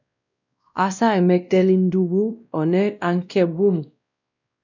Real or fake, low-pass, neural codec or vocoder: fake; 7.2 kHz; codec, 16 kHz, 1 kbps, X-Codec, WavLM features, trained on Multilingual LibriSpeech